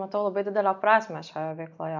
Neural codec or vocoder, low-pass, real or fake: none; 7.2 kHz; real